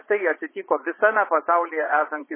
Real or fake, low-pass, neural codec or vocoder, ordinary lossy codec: fake; 3.6 kHz; vocoder, 22.05 kHz, 80 mel bands, Vocos; MP3, 16 kbps